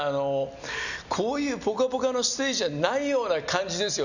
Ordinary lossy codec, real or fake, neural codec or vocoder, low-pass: none; real; none; 7.2 kHz